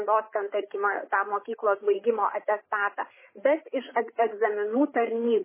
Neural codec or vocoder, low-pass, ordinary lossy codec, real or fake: codec, 16 kHz, 8 kbps, FreqCodec, larger model; 3.6 kHz; MP3, 16 kbps; fake